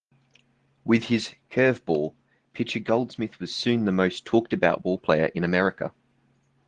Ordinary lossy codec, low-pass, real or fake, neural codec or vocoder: Opus, 16 kbps; 9.9 kHz; real; none